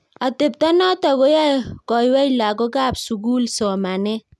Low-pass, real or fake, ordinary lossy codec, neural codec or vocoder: none; real; none; none